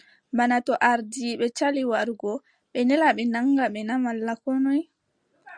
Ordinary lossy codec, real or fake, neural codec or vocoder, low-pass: Opus, 64 kbps; real; none; 9.9 kHz